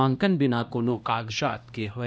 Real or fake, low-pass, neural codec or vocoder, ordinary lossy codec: fake; none; codec, 16 kHz, 1 kbps, X-Codec, HuBERT features, trained on LibriSpeech; none